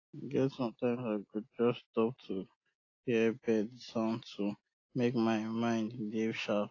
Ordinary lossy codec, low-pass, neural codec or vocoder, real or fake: AAC, 32 kbps; 7.2 kHz; none; real